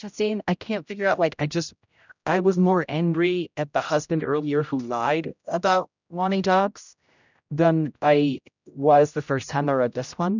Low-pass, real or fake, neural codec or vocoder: 7.2 kHz; fake; codec, 16 kHz, 0.5 kbps, X-Codec, HuBERT features, trained on general audio